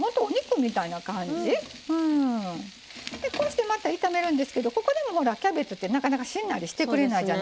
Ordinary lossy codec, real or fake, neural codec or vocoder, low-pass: none; real; none; none